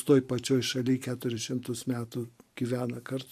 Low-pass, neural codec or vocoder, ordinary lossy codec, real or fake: 14.4 kHz; vocoder, 44.1 kHz, 128 mel bands every 512 samples, BigVGAN v2; AAC, 96 kbps; fake